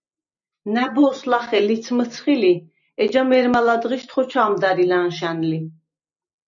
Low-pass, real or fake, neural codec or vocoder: 7.2 kHz; real; none